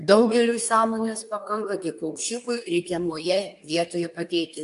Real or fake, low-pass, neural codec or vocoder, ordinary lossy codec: fake; 10.8 kHz; codec, 24 kHz, 1 kbps, SNAC; AAC, 64 kbps